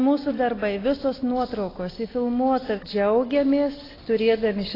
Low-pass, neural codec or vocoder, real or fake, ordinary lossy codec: 5.4 kHz; none; real; AAC, 24 kbps